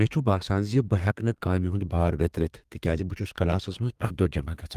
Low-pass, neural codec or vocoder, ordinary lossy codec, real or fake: 14.4 kHz; codec, 32 kHz, 1.9 kbps, SNAC; Opus, 32 kbps; fake